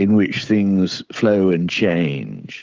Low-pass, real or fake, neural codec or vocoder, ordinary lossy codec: 7.2 kHz; fake; codec, 16 kHz, 16 kbps, FreqCodec, smaller model; Opus, 32 kbps